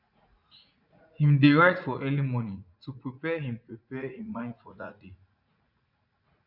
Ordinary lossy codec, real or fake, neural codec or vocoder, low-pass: none; fake; vocoder, 44.1 kHz, 80 mel bands, Vocos; 5.4 kHz